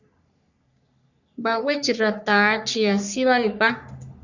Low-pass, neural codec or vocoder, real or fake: 7.2 kHz; codec, 44.1 kHz, 3.4 kbps, Pupu-Codec; fake